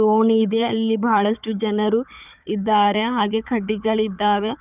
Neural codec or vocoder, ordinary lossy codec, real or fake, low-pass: codec, 16 kHz, 4 kbps, FunCodec, trained on Chinese and English, 50 frames a second; none; fake; 3.6 kHz